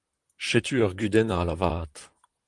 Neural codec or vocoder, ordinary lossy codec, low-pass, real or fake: vocoder, 44.1 kHz, 128 mel bands, Pupu-Vocoder; Opus, 24 kbps; 10.8 kHz; fake